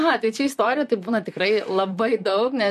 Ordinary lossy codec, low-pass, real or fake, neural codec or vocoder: MP3, 64 kbps; 14.4 kHz; fake; vocoder, 44.1 kHz, 128 mel bands, Pupu-Vocoder